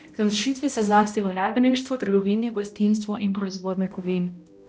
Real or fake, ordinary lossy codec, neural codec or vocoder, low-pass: fake; none; codec, 16 kHz, 0.5 kbps, X-Codec, HuBERT features, trained on balanced general audio; none